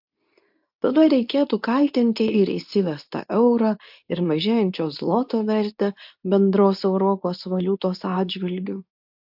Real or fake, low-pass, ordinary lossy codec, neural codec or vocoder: fake; 5.4 kHz; AAC, 48 kbps; codec, 24 kHz, 0.9 kbps, WavTokenizer, medium speech release version 2